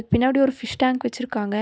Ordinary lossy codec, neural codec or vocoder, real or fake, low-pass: none; none; real; none